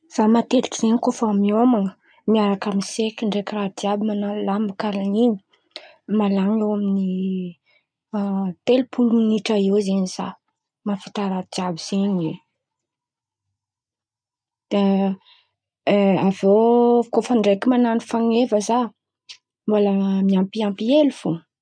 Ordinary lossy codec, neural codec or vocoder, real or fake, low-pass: none; none; real; 9.9 kHz